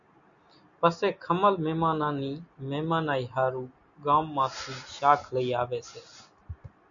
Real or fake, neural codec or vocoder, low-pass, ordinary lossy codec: real; none; 7.2 kHz; MP3, 96 kbps